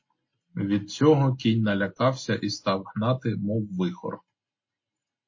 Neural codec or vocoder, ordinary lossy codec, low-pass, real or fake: none; MP3, 32 kbps; 7.2 kHz; real